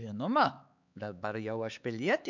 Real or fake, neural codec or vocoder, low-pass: fake; codec, 16 kHz, 4 kbps, X-Codec, HuBERT features, trained on LibriSpeech; 7.2 kHz